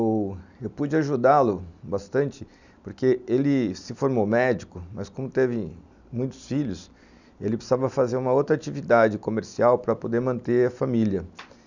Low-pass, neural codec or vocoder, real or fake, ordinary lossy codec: 7.2 kHz; none; real; none